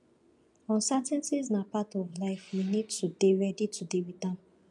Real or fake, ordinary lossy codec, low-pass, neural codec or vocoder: fake; none; 10.8 kHz; vocoder, 24 kHz, 100 mel bands, Vocos